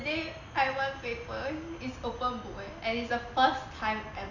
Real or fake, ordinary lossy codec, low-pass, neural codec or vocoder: real; none; 7.2 kHz; none